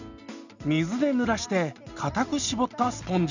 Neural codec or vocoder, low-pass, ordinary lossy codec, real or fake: none; 7.2 kHz; none; real